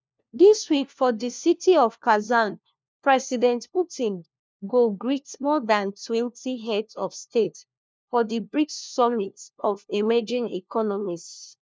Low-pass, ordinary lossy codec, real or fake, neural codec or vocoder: none; none; fake; codec, 16 kHz, 1 kbps, FunCodec, trained on LibriTTS, 50 frames a second